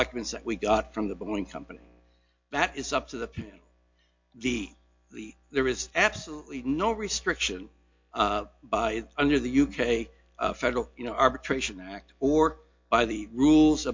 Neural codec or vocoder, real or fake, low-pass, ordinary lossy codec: none; real; 7.2 kHz; MP3, 48 kbps